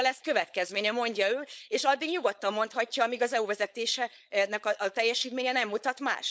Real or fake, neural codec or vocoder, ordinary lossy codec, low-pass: fake; codec, 16 kHz, 4.8 kbps, FACodec; none; none